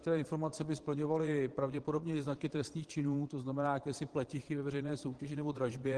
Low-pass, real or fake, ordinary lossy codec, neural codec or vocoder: 9.9 kHz; fake; Opus, 16 kbps; vocoder, 22.05 kHz, 80 mel bands, Vocos